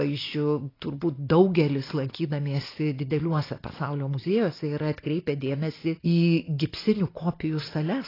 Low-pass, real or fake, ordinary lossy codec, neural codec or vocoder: 5.4 kHz; real; AAC, 24 kbps; none